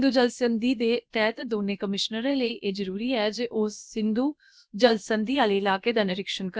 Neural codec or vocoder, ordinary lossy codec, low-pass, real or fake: codec, 16 kHz, about 1 kbps, DyCAST, with the encoder's durations; none; none; fake